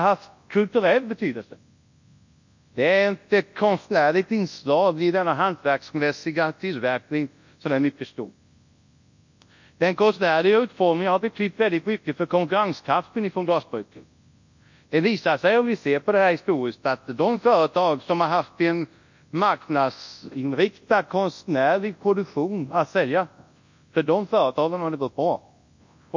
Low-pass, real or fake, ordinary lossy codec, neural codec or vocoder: 7.2 kHz; fake; MP3, 48 kbps; codec, 24 kHz, 0.9 kbps, WavTokenizer, large speech release